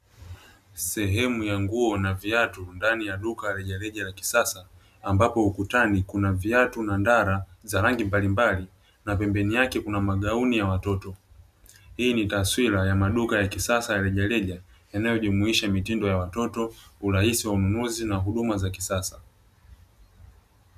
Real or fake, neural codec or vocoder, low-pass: real; none; 14.4 kHz